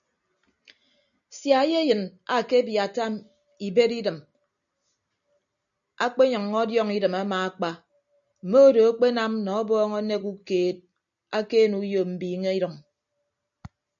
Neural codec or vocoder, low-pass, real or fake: none; 7.2 kHz; real